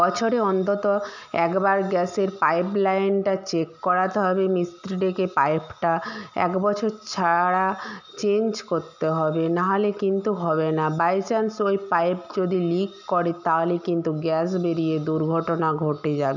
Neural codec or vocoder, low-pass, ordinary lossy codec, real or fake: none; 7.2 kHz; none; real